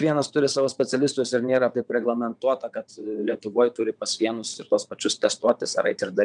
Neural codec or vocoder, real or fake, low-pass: vocoder, 22.05 kHz, 80 mel bands, WaveNeXt; fake; 9.9 kHz